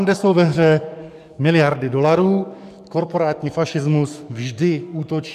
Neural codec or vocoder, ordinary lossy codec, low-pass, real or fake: codec, 44.1 kHz, 7.8 kbps, DAC; AAC, 96 kbps; 14.4 kHz; fake